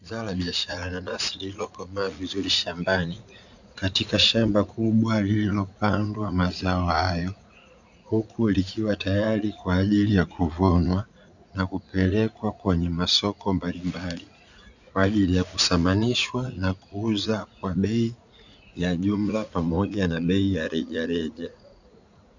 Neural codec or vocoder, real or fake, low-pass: vocoder, 44.1 kHz, 80 mel bands, Vocos; fake; 7.2 kHz